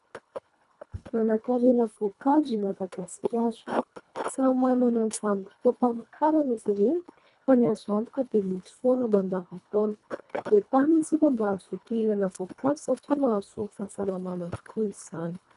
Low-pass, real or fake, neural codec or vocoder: 10.8 kHz; fake; codec, 24 kHz, 1.5 kbps, HILCodec